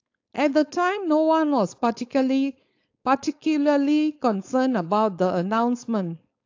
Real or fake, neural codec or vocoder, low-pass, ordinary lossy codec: fake; codec, 16 kHz, 4.8 kbps, FACodec; 7.2 kHz; AAC, 48 kbps